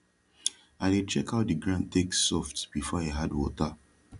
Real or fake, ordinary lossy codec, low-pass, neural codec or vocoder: real; none; 10.8 kHz; none